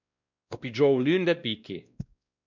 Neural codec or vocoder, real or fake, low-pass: codec, 16 kHz, 1 kbps, X-Codec, WavLM features, trained on Multilingual LibriSpeech; fake; 7.2 kHz